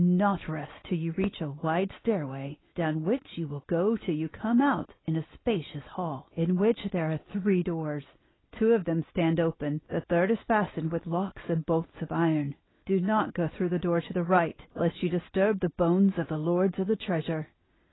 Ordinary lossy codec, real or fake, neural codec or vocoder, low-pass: AAC, 16 kbps; real; none; 7.2 kHz